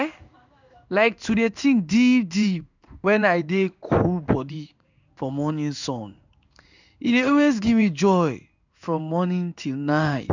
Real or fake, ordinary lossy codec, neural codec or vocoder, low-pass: fake; none; codec, 16 kHz in and 24 kHz out, 1 kbps, XY-Tokenizer; 7.2 kHz